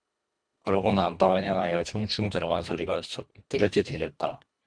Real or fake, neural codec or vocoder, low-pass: fake; codec, 24 kHz, 1.5 kbps, HILCodec; 9.9 kHz